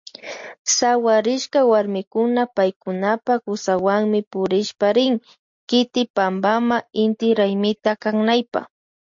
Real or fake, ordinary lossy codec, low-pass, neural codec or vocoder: real; MP3, 48 kbps; 7.2 kHz; none